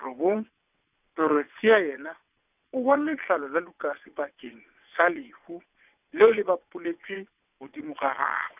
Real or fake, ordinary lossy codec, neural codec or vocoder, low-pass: fake; none; vocoder, 22.05 kHz, 80 mel bands, WaveNeXt; 3.6 kHz